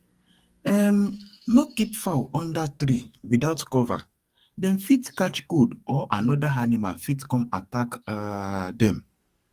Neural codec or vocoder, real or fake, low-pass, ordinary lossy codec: codec, 44.1 kHz, 2.6 kbps, SNAC; fake; 14.4 kHz; Opus, 32 kbps